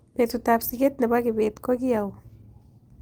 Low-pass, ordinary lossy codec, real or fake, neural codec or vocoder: 19.8 kHz; Opus, 24 kbps; real; none